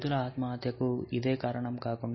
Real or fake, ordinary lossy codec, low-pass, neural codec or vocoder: real; MP3, 24 kbps; 7.2 kHz; none